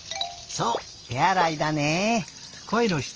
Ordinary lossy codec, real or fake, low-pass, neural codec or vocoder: Opus, 24 kbps; real; 7.2 kHz; none